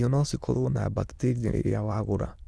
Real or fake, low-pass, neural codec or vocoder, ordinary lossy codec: fake; none; autoencoder, 22.05 kHz, a latent of 192 numbers a frame, VITS, trained on many speakers; none